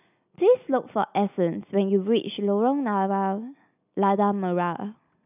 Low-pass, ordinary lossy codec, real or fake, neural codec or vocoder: 3.6 kHz; none; real; none